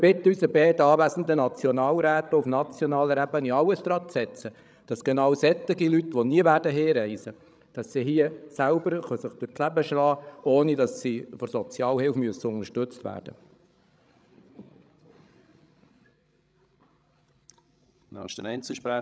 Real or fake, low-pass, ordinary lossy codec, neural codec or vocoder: fake; none; none; codec, 16 kHz, 16 kbps, FreqCodec, larger model